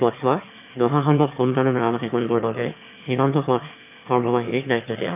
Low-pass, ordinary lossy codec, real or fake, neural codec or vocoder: 3.6 kHz; none; fake; autoencoder, 22.05 kHz, a latent of 192 numbers a frame, VITS, trained on one speaker